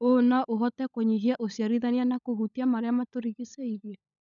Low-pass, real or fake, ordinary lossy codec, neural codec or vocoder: 7.2 kHz; fake; none; codec, 16 kHz, 16 kbps, FunCodec, trained on LibriTTS, 50 frames a second